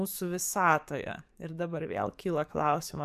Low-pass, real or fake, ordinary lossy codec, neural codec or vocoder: 14.4 kHz; fake; MP3, 96 kbps; codec, 44.1 kHz, 7.8 kbps, DAC